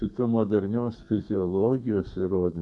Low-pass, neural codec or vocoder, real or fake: 10.8 kHz; codec, 32 kHz, 1.9 kbps, SNAC; fake